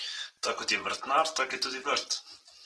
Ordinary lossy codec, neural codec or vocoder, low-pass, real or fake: Opus, 16 kbps; none; 10.8 kHz; real